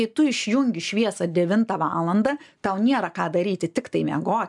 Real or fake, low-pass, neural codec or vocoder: real; 10.8 kHz; none